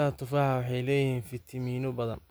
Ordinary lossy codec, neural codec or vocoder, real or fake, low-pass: none; none; real; none